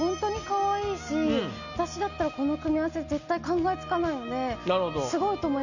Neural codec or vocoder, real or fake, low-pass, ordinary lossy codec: none; real; 7.2 kHz; none